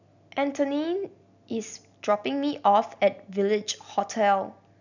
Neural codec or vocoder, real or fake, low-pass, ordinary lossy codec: none; real; 7.2 kHz; none